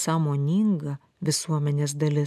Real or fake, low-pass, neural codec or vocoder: real; 14.4 kHz; none